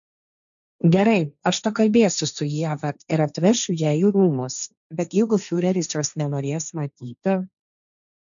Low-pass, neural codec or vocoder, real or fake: 7.2 kHz; codec, 16 kHz, 1.1 kbps, Voila-Tokenizer; fake